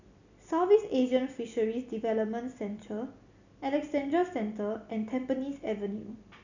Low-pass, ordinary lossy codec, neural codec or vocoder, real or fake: 7.2 kHz; none; none; real